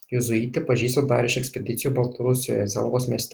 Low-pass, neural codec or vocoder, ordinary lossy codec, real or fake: 19.8 kHz; none; Opus, 16 kbps; real